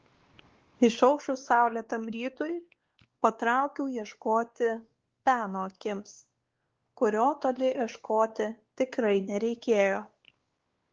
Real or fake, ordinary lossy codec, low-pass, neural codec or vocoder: fake; Opus, 16 kbps; 7.2 kHz; codec, 16 kHz, 2 kbps, X-Codec, WavLM features, trained on Multilingual LibriSpeech